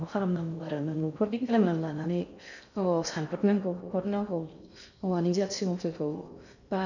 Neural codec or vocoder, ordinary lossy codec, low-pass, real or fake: codec, 16 kHz in and 24 kHz out, 0.6 kbps, FocalCodec, streaming, 4096 codes; none; 7.2 kHz; fake